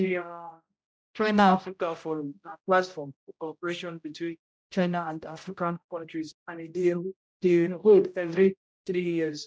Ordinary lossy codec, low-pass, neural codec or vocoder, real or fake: none; none; codec, 16 kHz, 0.5 kbps, X-Codec, HuBERT features, trained on general audio; fake